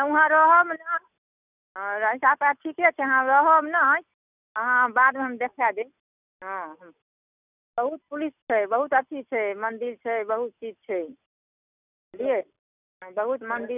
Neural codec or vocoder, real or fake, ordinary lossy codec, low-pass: none; real; none; 3.6 kHz